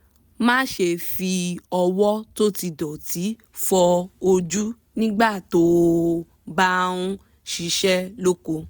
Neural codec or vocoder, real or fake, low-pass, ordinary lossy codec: none; real; none; none